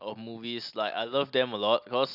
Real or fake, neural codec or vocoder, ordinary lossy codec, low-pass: real; none; none; 5.4 kHz